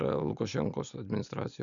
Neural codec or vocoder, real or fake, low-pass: none; real; 7.2 kHz